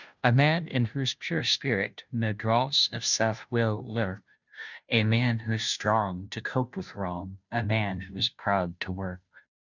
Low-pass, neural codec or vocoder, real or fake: 7.2 kHz; codec, 16 kHz, 0.5 kbps, FunCodec, trained on Chinese and English, 25 frames a second; fake